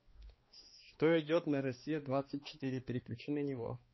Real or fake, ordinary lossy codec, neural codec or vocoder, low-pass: fake; MP3, 24 kbps; codec, 16 kHz, 2 kbps, X-Codec, HuBERT features, trained on balanced general audio; 7.2 kHz